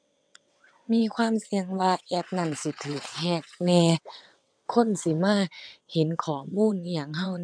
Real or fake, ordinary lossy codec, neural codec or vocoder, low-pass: fake; none; vocoder, 22.05 kHz, 80 mel bands, WaveNeXt; 9.9 kHz